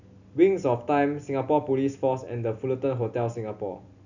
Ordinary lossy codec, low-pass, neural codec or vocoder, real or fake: none; 7.2 kHz; none; real